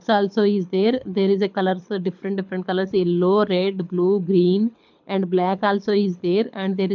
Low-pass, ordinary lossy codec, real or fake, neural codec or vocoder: 7.2 kHz; none; fake; codec, 24 kHz, 6 kbps, HILCodec